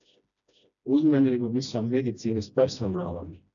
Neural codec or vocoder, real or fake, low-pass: codec, 16 kHz, 1 kbps, FreqCodec, smaller model; fake; 7.2 kHz